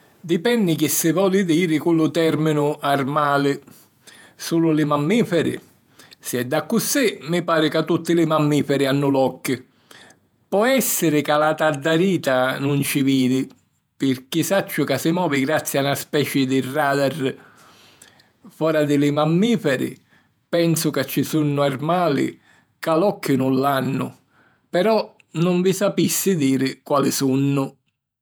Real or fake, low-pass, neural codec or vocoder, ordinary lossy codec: fake; none; vocoder, 48 kHz, 128 mel bands, Vocos; none